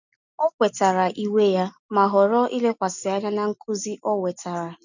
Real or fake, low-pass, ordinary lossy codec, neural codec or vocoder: real; 7.2 kHz; none; none